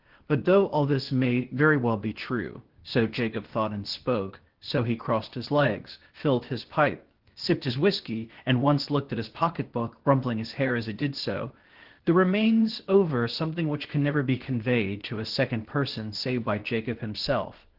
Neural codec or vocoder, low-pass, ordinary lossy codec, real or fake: codec, 16 kHz, 0.8 kbps, ZipCodec; 5.4 kHz; Opus, 16 kbps; fake